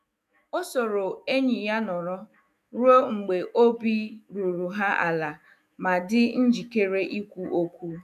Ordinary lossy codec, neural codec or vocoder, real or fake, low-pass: AAC, 96 kbps; autoencoder, 48 kHz, 128 numbers a frame, DAC-VAE, trained on Japanese speech; fake; 14.4 kHz